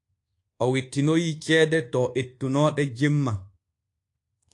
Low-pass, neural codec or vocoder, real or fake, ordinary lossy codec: 10.8 kHz; codec, 24 kHz, 1.2 kbps, DualCodec; fake; AAC, 48 kbps